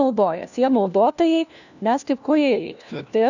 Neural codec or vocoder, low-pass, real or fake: codec, 16 kHz, 1 kbps, FunCodec, trained on LibriTTS, 50 frames a second; 7.2 kHz; fake